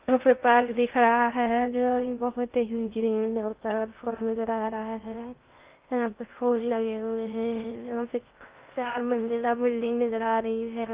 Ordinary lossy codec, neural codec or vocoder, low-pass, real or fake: Opus, 32 kbps; codec, 16 kHz in and 24 kHz out, 0.6 kbps, FocalCodec, streaming, 2048 codes; 3.6 kHz; fake